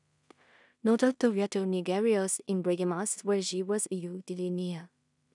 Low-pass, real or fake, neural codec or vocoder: 10.8 kHz; fake; codec, 16 kHz in and 24 kHz out, 0.4 kbps, LongCat-Audio-Codec, two codebook decoder